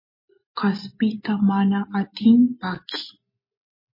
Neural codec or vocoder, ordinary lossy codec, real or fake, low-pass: none; MP3, 24 kbps; real; 5.4 kHz